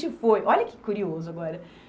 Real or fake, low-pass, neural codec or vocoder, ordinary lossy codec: real; none; none; none